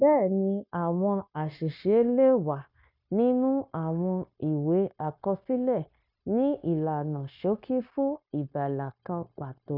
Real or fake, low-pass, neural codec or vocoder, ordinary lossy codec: fake; 5.4 kHz; codec, 16 kHz, 0.9 kbps, LongCat-Audio-Codec; none